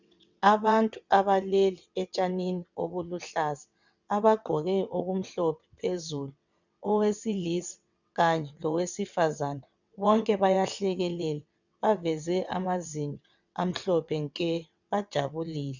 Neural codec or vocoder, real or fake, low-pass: vocoder, 22.05 kHz, 80 mel bands, WaveNeXt; fake; 7.2 kHz